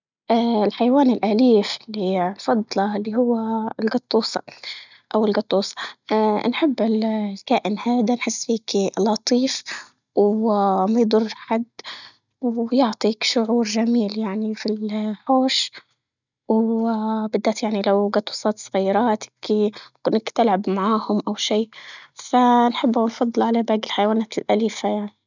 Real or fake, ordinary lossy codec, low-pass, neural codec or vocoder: real; none; 7.2 kHz; none